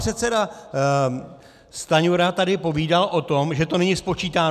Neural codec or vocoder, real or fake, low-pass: none; real; 14.4 kHz